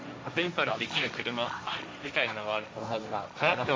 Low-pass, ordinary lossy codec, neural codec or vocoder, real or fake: none; none; codec, 16 kHz, 1.1 kbps, Voila-Tokenizer; fake